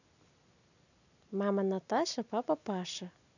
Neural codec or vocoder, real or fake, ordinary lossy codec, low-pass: none; real; none; 7.2 kHz